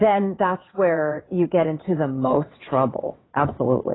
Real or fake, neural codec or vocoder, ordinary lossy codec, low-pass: fake; vocoder, 22.05 kHz, 80 mel bands, WaveNeXt; AAC, 16 kbps; 7.2 kHz